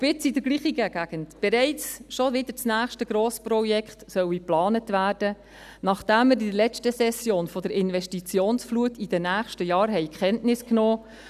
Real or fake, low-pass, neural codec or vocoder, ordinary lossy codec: real; 14.4 kHz; none; none